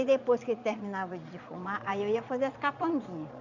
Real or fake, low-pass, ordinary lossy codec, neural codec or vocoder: fake; 7.2 kHz; none; vocoder, 22.05 kHz, 80 mel bands, Vocos